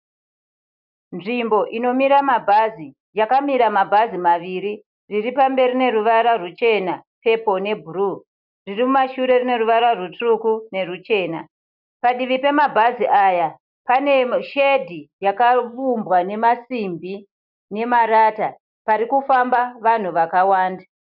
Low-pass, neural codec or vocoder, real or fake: 5.4 kHz; none; real